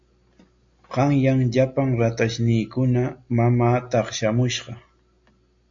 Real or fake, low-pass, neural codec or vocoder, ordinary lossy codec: real; 7.2 kHz; none; MP3, 48 kbps